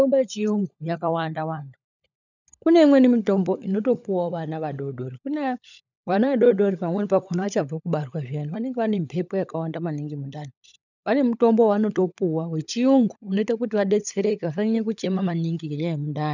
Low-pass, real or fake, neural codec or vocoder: 7.2 kHz; fake; codec, 16 kHz, 16 kbps, FunCodec, trained on LibriTTS, 50 frames a second